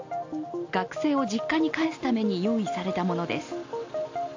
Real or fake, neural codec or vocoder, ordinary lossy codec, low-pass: real; none; AAC, 48 kbps; 7.2 kHz